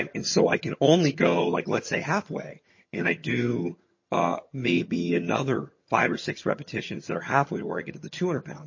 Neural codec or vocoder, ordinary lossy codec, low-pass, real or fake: vocoder, 22.05 kHz, 80 mel bands, HiFi-GAN; MP3, 32 kbps; 7.2 kHz; fake